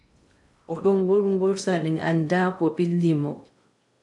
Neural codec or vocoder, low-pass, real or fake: codec, 16 kHz in and 24 kHz out, 0.6 kbps, FocalCodec, streaming, 2048 codes; 10.8 kHz; fake